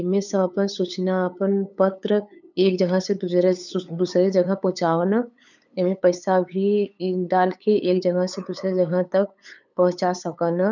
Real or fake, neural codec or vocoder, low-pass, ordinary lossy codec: fake; codec, 16 kHz, 8 kbps, FunCodec, trained on LibriTTS, 25 frames a second; 7.2 kHz; none